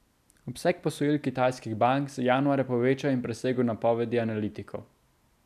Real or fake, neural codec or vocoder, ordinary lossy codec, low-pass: real; none; none; 14.4 kHz